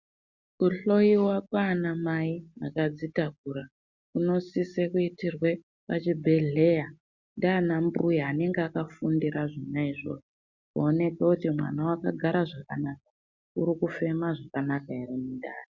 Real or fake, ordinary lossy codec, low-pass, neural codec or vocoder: real; Opus, 64 kbps; 7.2 kHz; none